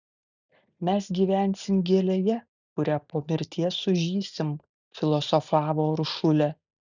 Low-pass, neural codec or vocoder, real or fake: 7.2 kHz; none; real